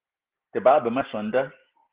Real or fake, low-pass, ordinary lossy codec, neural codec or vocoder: real; 3.6 kHz; Opus, 16 kbps; none